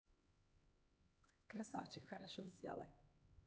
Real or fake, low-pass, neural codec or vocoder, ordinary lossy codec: fake; none; codec, 16 kHz, 2 kbps, X-Codec, HuBERT features, trained on LibriSpeech; none